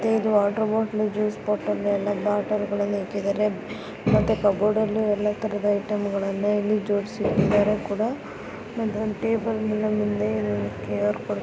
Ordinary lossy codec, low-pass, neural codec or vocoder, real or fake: none; none; none; real